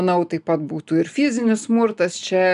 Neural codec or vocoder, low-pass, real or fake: none; 10.8 kHz; real